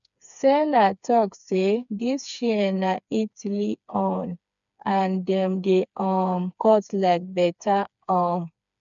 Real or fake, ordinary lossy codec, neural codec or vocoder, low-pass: fake; none; codec, 16 kHz, 4 kbps, FreqCodec, smaller model; 7.2 kHz